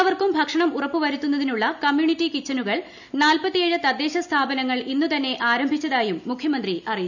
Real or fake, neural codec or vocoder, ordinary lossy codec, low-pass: real; none; none; 7.2 kHz